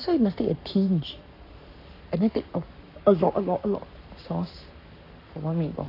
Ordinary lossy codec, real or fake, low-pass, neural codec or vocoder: AAC, 32 kbps; fake; 5.4 kHz; codec, 44.1 kHz, 7.8 kbps, Pupu-Codec